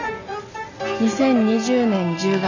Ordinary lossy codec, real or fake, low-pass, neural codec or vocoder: none; real; 7.2 kHz; none